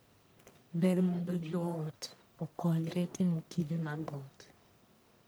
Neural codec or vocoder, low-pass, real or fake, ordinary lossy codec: codec, 44.1 kHz, 1.7 kbps, Pupu-Codec; none; fake; none